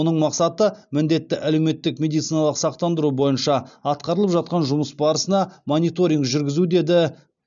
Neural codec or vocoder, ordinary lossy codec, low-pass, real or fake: none; none; 7.2 kHz; real